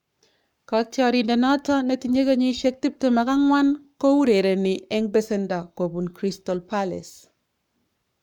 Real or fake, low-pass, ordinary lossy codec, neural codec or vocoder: fake; 19.8 kHz; none; codec, 44.1 kHz, 7.8 kbps, Pupu-Codec